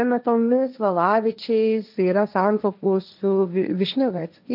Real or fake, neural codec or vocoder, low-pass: fake; codec, 16 kHz, 1.1 kbps, Voila-Tokenizer; 5.4 kHz